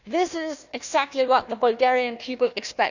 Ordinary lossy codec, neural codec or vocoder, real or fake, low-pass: none; codec, 16 kHz, 1 kbps, FunCodec, trained on Chinese and English, 50 frames a second; fake; 7.2 kHz